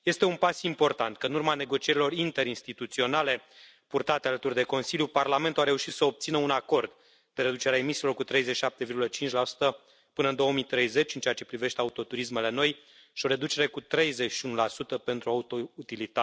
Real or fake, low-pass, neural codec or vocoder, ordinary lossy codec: real; none; none; none